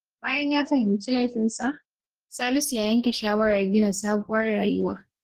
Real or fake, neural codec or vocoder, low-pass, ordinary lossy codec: fake; codec, 44.1 kHz, 2.6 kbps, DAC; 14.4 kHz; Opus, 16 kbps